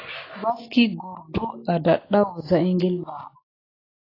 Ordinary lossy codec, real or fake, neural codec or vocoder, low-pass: AAC, 24 kbps; real; none; 5.4 kHz